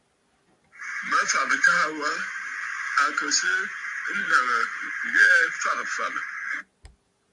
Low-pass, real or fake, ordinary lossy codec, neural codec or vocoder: 10.8 kHz; real; MP3, 64 kbps; none